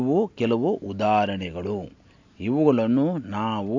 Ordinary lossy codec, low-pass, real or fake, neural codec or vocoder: AAC, 48 kbps; 7.2 kHz; real; none